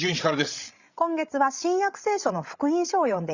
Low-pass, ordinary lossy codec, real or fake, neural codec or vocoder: 7.2 kHz; Opus, 64 kbps; fake; codec, 16 kHz, 16 kbps, FreqCodec, larger model